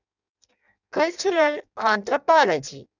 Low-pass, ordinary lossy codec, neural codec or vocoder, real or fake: 7.2 kHz; none; codec, 16 kHz in and 24 kHz out, 0.6 kbps, FireRedTTS-2 codec; fake